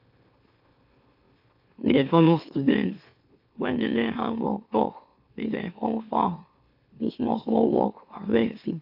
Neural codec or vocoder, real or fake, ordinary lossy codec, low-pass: autoencoder, 44.1 kHz, a latent of 192 numbers a frame, MeloTTS; fake; AAC, 48 kbps; 5.4 kHz